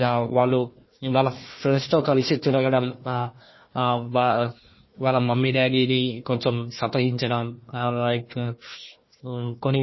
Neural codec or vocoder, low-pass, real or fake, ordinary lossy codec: codec, 16 kHz, 1 kbps, FunCodec, trained on Chinese and English, 50 frames a second; 7.2 kHz; fake; MP3, 24 kbps